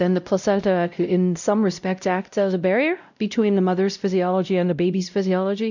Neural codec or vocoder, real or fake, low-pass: codec, 16 kHz, 0.5 kbps, X-Codec, WavLM features, trained on Multilingual LibriSpeech; fake; 7.2 kHz